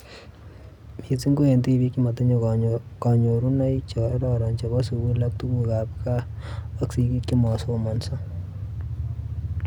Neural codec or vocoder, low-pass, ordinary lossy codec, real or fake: none; 19.8 kHz; none; real